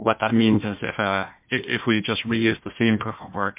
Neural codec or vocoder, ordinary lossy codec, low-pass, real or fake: codec, 16 kHz, 1 kbps, FunCodec, trained on Chinese and English, 50 frames a second; MP3, 32 kbps; 3.6 kHz; fake